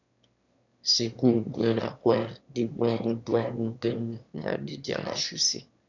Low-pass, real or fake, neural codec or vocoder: 7.2 kHz; fake; autoencoder, 22.05 kHz, a latent of 192 numbers a frame, VITS, trained on one speaker